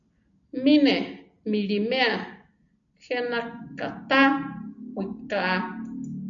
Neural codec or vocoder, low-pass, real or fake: none; 7.2 kHz; real